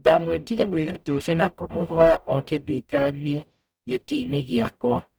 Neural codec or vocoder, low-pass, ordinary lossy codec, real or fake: codec, 44.1 kHz, 0.9 kbps, DAC; none; none; fake